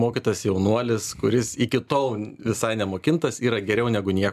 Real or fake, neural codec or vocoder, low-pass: real; none; 14.4 kHz